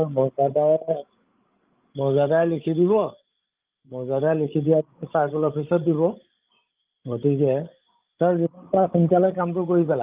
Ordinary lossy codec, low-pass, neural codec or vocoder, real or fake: Opus, 32 kbps; 3.6 kHz; codec, 16 kHz, 16 kbps, FreqCodec, larger model; fake